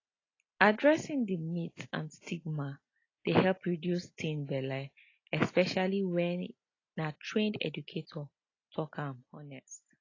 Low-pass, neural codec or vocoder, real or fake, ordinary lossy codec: 7.2 kHz; none; real; AAC, 32 kbps